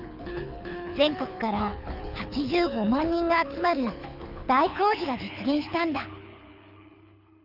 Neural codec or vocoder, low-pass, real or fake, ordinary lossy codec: codec, 24 kHz, 6 kbps, HILCodec; 5.4 kHz; fake; none